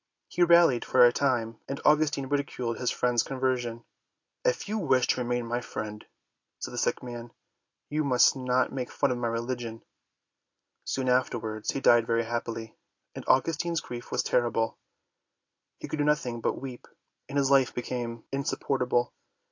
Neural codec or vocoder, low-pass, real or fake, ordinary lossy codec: none; 7.2 kHz; real; AAC, 48 kbps